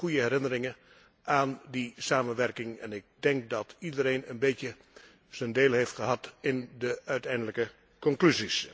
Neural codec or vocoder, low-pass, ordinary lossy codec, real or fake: none; none; none; real